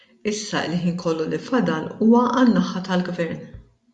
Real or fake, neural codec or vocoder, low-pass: real; none; 10.8 kHz